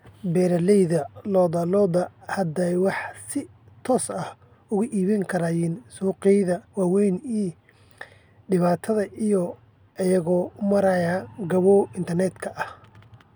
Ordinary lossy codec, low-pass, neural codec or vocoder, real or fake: none; none; none; real